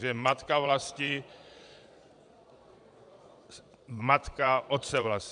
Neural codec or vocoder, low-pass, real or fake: vocoder, 22.05 kHz, 80 mel bands, Vocos; 9.9 kHz; fake